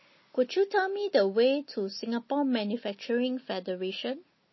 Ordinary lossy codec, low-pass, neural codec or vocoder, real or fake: MP3, 24 kbps; 7.2 kHz; none; real